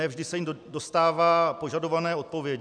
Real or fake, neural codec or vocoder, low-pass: real; none; 9.9 kHz